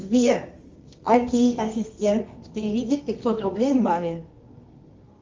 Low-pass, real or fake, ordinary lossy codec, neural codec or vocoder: 7.2 kHz; fake; Opus, 32 kbps; codec, 24 kHz, 0.9 kbps, WavTokenizer, medium music audio release